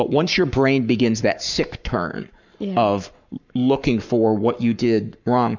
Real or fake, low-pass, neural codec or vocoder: fake; 7.2 kHz; codec, 44.1 kHz, 7.8 kbps, Pupu-Codec